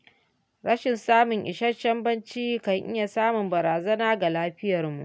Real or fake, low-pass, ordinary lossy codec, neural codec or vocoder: real; none; none; none